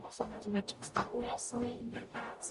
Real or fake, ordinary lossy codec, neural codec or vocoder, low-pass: fake; MP3, 48 kbps; codec, 44.1 kHz, 0.9 kbps, DAC; 14.4 kHz